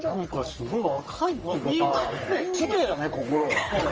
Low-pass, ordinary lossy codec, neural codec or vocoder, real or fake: 7.2 kHz; Opus, 24 kbps; codec, 44.1 kHz, 3.4 kbps, Pupu-Codec; fake